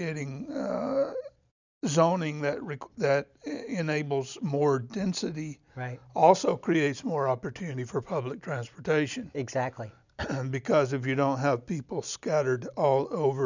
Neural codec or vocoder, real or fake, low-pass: none; real; 7.2 kHz